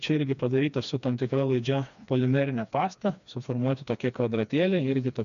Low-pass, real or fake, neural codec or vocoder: 7.2 kHz; fake; codec, 16 kHz, 2 kbps, FreqCodec, smaller model